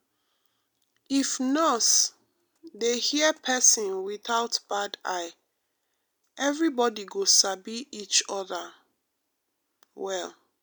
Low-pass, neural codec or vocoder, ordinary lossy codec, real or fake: none; none; none; real